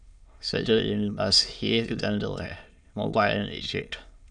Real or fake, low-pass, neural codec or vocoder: fake; 9.9 kHz; autoencoder, 22.05 kHz, a latent of 192 numbers a frame, VITS, trained on many speakers